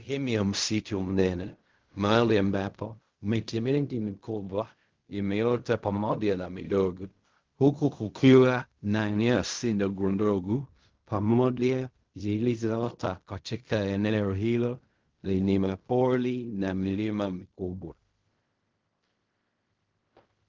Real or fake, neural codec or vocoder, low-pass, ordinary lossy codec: fake; codec, 16 kHz in and 24 kHz out, 0.4 kbps, LongCat-Audio-Codec, fine tuned four codebook decoder; 7.2 kHz; Opus, 32 kbps